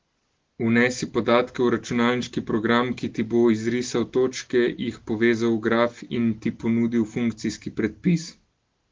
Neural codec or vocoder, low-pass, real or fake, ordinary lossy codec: none; 7.2 kHz; real; Opus, 16 kbps